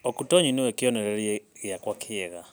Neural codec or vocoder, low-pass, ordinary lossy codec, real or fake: none; none; none; real